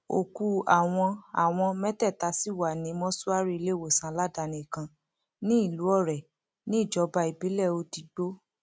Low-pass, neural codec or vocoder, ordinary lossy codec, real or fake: none; none; none; real